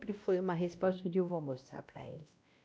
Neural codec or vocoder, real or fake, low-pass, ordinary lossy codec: codec, 16 kHz, 1 kbps, X-Codec, WavLM features, trained on Multilingual LibriSpeech; fake; none; none